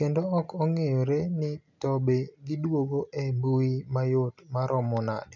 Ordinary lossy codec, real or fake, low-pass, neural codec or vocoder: none; real; 7.2 kHz; none